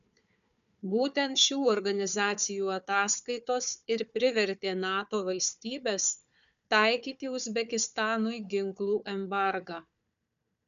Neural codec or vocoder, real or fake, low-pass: codec, 16 kHz, 4 kbps, FunCodec, trained on Chinese and English, 50 frames a second; fake; 7.2 kHz